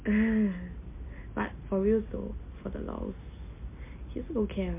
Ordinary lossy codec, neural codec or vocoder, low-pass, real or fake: MP3, 24 kbps; none; 3.6 kHz; real